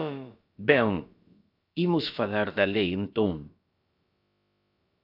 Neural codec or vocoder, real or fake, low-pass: codec, 16 kHz, about 1 kbps, DyCAST, with the encoder's durations; fake; 5.4 kHz